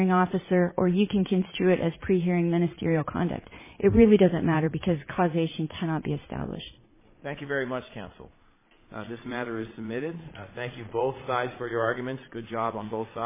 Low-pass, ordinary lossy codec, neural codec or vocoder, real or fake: 3.6 kHz; MP3, 16 kbps; vocoder, 22.05 kHz, 80 mel bands, Vocos; fake